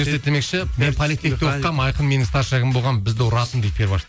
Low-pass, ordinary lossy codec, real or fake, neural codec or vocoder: none; none; real; none